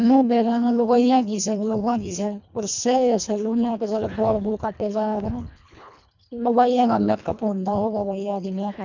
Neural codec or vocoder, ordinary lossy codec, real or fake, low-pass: codec, 24 kHz, 1.5 kbps, HILCodec; none; fake; 7.2 kHz